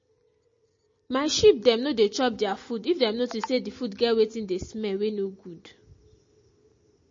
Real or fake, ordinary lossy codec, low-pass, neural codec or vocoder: real; MP3, 32 kbps; 7.2 kHz; none